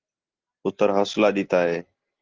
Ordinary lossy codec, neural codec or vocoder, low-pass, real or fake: Opus, 16 kbps; none; 7.2 kHz; real